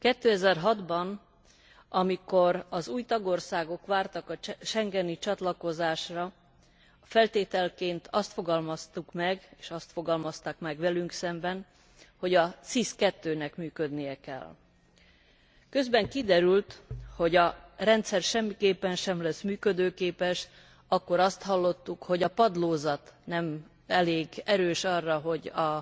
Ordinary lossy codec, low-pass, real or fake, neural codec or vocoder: none; none; real; none